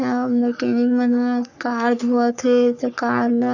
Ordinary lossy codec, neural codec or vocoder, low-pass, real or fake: none; codec, 44.1 kHz, 3.4 kbps, Pupu-Codec; 7.2 kHz; fake